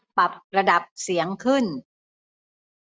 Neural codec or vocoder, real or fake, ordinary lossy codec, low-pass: none; real; none; none